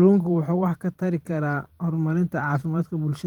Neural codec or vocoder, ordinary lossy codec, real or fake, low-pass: vocoder, 44.1 kHz, 128 mel bands every 512 samples, BigVGAN v2; Opus, 24 kbps; fake; 19.8 kHz